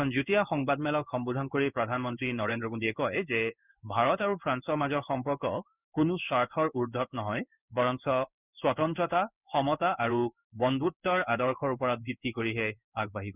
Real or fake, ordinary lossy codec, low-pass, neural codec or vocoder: fake; none; 3.6 kHz; codec, 16 kHz in and 24 kHz out, 1 kbps, XY-Tokenizer